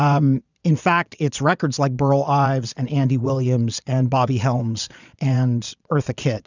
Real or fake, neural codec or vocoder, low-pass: fake; vocoder, 22.05 kHz, 80 mel bands, Vocos; 7.2 kHz